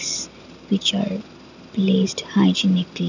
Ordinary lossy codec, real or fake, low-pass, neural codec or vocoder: none; real; 7.2 kHz; none